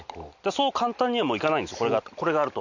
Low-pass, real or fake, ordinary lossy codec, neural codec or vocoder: 7.2 kHz; real; none; none